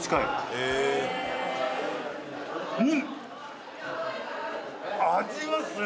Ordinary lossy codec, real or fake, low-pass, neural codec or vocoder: none; real; none; none